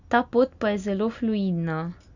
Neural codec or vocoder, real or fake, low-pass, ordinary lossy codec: none; real; 7.2 kHz; none